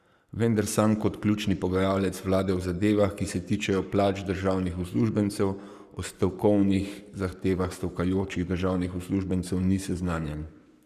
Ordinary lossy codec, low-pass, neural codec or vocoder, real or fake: Opus, 64 kbps; 14.4 kHz; codec, 44.1 kHz, 7.8 kbps, Pupu-Codec; fake